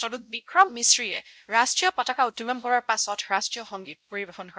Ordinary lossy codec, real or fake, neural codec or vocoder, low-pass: none; fake; codec, 16 kHz, 0.5 kbps, X-Codec, WavLM features, trained on Multilingual LibriSpeech; none